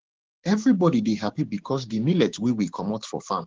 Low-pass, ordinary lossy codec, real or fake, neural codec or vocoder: 7.2 kHz; Opus, 16 kbps; fake; codec, 16 kHz, 6 kbps, DAC